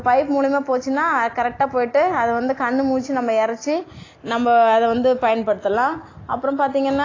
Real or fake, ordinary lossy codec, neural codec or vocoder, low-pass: real; AAC, 32 kbps; none; 7.2 kHz